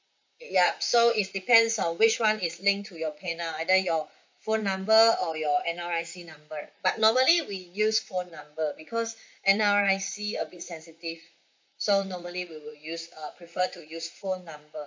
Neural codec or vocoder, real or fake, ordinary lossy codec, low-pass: vocoder, 44.1 kHz, 128 mel bands, Pupu-Vocoder; fake; MP3, 64 kbps; 7.2 kHz